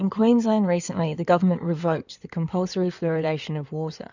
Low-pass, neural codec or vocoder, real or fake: 7.2 kHz; codec, 16 kHz in and 24 kHz out, 2.2 kbps, FireRedTTS-2 codec; fake